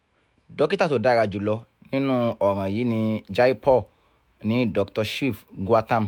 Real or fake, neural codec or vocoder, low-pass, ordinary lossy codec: fake; autoencoder, 48 kHz, 128 numbers a frame, DAC-VAE, trained on Japanese speech; 19.8 kHz; MP3, 96 kbps